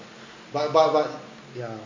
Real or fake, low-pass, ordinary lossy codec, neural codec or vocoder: real; 7.2 kHz; MP3, 48 kbps; none